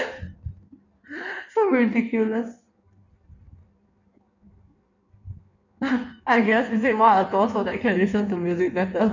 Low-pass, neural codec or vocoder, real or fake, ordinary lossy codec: 7.2 kHz; codec, 16 kHz in and 24 kHz out, 1.1 kbps, FireRedTTS-2 codec; fake; none